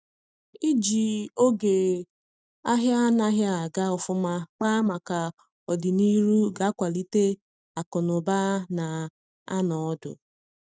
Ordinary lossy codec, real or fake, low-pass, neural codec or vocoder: none; real; none; none